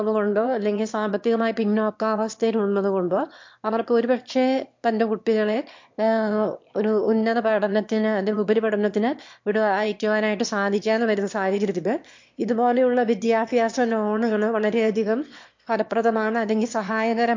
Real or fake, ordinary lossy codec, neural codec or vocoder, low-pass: fake; MP3, 48 kbps; autoencoder, 22.05 kHz, a latent of 192 numbers a frame, VITS, trained on one speaker; 7.2 kHz